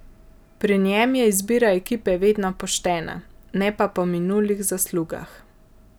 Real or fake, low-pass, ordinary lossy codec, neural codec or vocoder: real; none; none; none